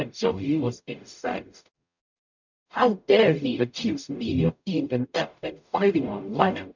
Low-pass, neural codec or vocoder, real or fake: 7.2 kHz; codec, 44.1 kHz, 0.9 kbps, DAC; fake